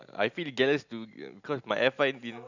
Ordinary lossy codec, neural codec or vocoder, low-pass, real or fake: none; none; 7.2 kHz; real